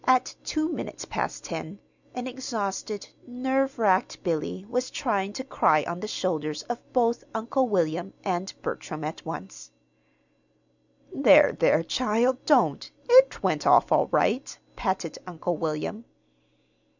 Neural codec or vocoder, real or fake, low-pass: none; real; 7.2 kHz